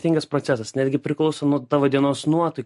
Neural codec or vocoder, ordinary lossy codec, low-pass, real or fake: none; MP3, 48 kbps; 14.4 kHz; real